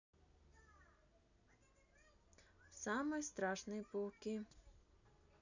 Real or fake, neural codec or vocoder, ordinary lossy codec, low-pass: real; none; none; 7.2 kHz